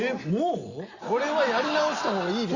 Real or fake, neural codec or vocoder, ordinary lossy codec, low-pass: real; none; Opus, 64 kbps; 7.2 kHz